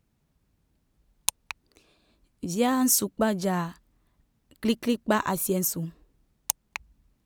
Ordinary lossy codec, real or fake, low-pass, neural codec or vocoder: none; real; none; none